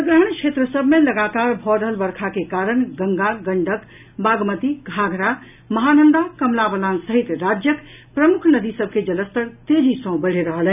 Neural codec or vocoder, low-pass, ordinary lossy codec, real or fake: none; 3.6 kHz; none; real